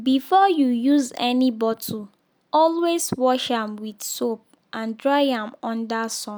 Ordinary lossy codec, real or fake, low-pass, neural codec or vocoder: none; real; none; none